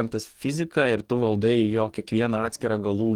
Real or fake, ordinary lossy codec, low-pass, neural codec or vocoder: fake; Opus, 24 kbps; 19.8 kHz; codec, 44.1 kHz, 2.6 kbps, DAC